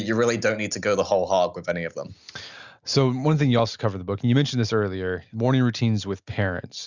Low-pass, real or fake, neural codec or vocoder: 7.2 kHz; real; none